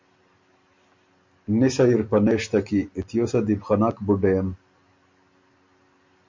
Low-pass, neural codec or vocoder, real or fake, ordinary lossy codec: 7.2 kHz; none; real; MP3, 48 kbps